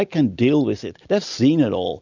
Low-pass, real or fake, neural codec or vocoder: 7.2 kHz; real; none